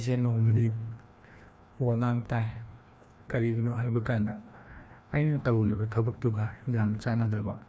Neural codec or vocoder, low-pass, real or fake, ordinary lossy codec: codec, 16 kHz, 1 kbps, FreqCodec, larger model; none; fake; none